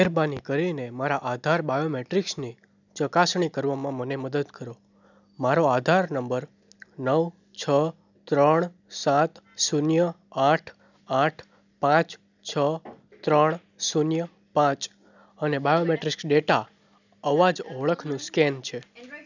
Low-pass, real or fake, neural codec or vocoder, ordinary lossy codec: 7.2 kHz; real; none; none